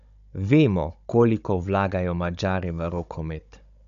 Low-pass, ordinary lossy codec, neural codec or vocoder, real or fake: 7.2 kHz; none; codec, 16 kHz, 16 kbps, FunCodec, trained on Chinese and English, 50 frames a second; fake